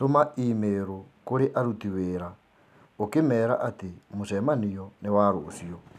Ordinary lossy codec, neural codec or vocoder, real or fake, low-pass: none; none; real; 14.4 kHz